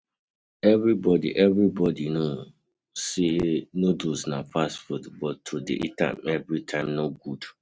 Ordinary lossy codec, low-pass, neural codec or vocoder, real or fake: none; none; none; real